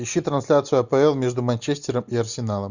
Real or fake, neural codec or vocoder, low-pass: real; none; 7.2 kHz